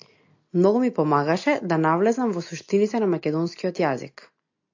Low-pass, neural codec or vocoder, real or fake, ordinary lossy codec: 7.2 kHz; none; real; AAC, 48 kbps